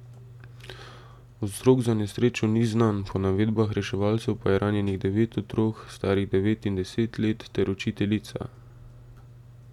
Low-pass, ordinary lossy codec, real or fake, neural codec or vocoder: 19.8 kHz; none; real; none